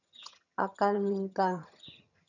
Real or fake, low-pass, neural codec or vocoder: fake; 7.2 kHz; vocoder, 22.05 kHz, 80 mel bands, HiFi-GAN